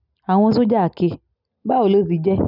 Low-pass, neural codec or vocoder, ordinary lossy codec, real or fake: 5.4 kHz; none; none; real